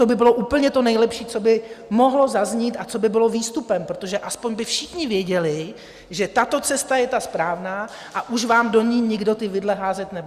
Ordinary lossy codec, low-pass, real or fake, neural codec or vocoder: Opus, 64 kbps; 14.4 kHz; real; none